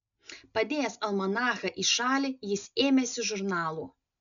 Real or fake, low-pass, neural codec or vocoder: real; 7.2 kHz; none